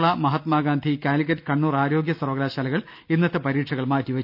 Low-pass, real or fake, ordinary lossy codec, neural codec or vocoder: 5.4 kHz; real; none; none